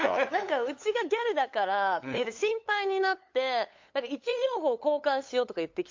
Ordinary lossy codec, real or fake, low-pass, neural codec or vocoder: MP3, 48 kbps; fake; 7.2 kHz; codec, 16 kHz, 8 kbps, FunCodec, trained on LibriTTS, 25 frames a second